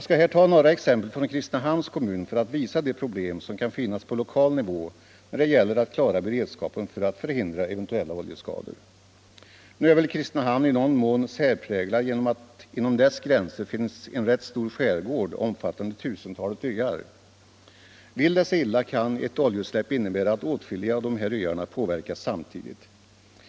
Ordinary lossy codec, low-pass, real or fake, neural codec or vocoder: none; none; real; none